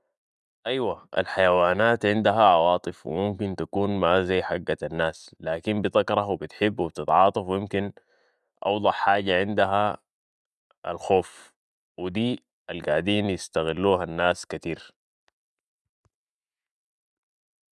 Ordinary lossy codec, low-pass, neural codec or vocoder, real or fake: none; none; none; real